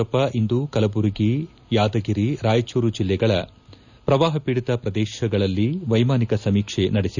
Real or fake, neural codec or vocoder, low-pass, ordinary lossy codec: real; none; 7.2 kHz; none